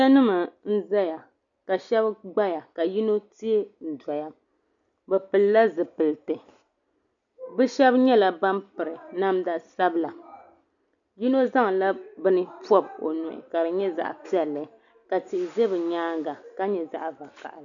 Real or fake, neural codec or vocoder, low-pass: real; none; 7.2 kHz